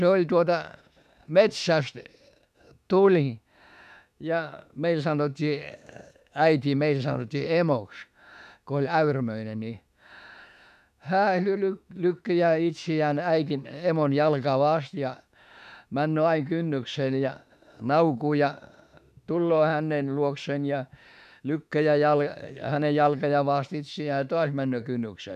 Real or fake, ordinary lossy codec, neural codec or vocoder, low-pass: fake; none; autoencoder, 48 kHz, 32 numbers a frame, DAC-VAE, trained on Japanese speech; 14.4 kHz